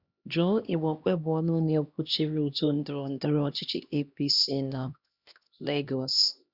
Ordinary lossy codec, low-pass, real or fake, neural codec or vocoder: Opus, 64 kbps; 5.4 kHz; fake; codec, 16 kHz, 1 kbps, X-Codec, HuBERT features, trained on LibriSpeech